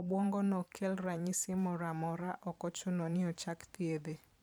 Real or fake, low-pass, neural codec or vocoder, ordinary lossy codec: fake; none; vocoder, 44.1 kHz, 128 mel bands every 512 samples, BigVGAN v2; none